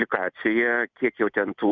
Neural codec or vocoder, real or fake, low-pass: none; real; 7.2 kHz